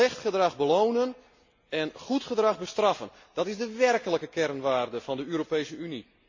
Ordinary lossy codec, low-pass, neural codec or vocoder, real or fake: MP3, 32 kbps; 7.2 kHz; none; real